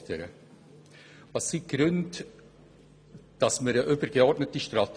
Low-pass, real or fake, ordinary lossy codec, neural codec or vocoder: none; real; none; none